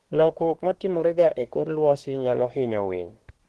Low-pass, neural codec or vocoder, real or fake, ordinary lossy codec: 10.8 kHz; codec, 24 kHz, 1 kbps, SNAC; fake; Opus, 16 kbps